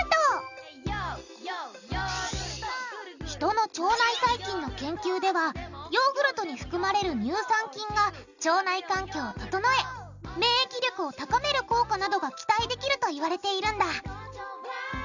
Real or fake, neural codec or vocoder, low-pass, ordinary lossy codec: real; none; 7.2 kHz; none